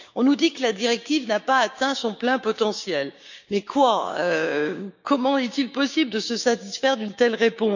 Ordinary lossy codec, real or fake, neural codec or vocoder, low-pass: none; fake; codec, 16 kHz, 6 kbps, DAC; 7.2 kHz